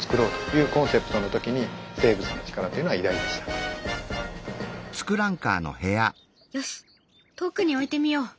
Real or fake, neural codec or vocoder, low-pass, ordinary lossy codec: real; none; none; none